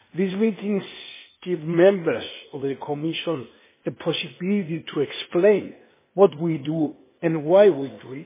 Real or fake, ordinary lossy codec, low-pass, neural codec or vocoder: fake; MP3, 16 kbps; 3.6 kHz; codec, 16 kHz, 0.8 kbps, ZipCodec